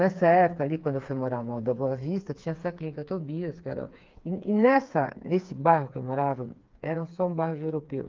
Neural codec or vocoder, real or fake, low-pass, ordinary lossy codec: codec, 16 kHz, 4 kbps, FreqCodec, smaller model; fake; 7.2 kHz; Opus, 32 kbps